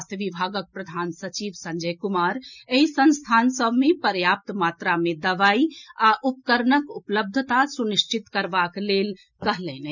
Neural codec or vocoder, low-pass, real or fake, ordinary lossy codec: none; 7.2 kHz; real; none